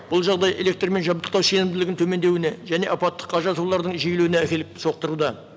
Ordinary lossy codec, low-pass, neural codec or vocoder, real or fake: none; none; none; real